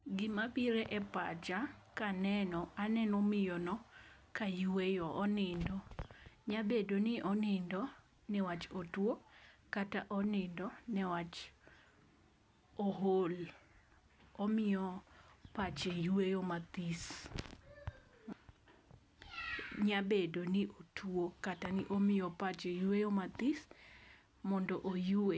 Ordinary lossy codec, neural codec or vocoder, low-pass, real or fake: none; none; none; real